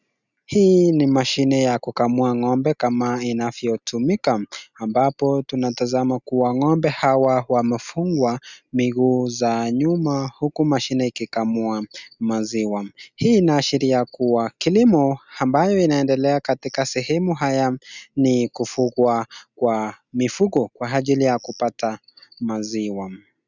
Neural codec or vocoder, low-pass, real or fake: none; 7.2 kHz; real